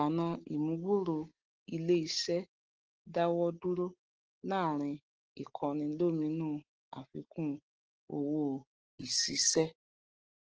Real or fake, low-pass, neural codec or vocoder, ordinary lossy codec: real; 7.2 kHz; none; Opus, 16 kbps